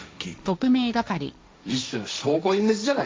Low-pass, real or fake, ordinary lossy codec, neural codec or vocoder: none; fake; none; codec, 16 kHz, 1.1 kbps, Voila-Tokenizer